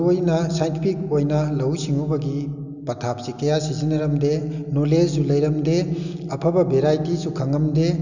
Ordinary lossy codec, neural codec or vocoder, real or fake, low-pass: none; none; real; 7.2 kHz